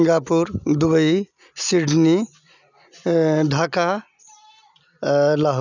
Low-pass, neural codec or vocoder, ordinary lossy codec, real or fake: 7.2 kHz; none; none; real